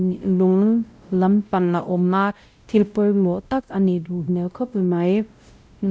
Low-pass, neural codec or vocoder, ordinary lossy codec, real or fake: none; codec, 16 kHz, 0.5 kbps, X-Codec, WavLM features, trained on Multilingual LibriSpeech; none; fake